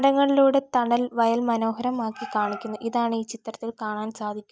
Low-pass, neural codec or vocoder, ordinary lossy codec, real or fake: none; none; none; real